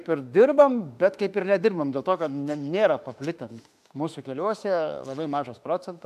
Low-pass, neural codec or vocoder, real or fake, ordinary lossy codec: 14.4 kHz; autoencoder, 48 kHz, 32 numbers a frame, DAC-VAE, trained on Japanese speech; fake; AAC, 96 kbps